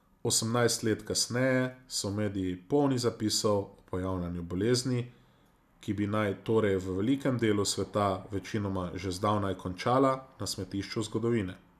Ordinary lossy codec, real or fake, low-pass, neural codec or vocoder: none; real; 14.4 kHz; none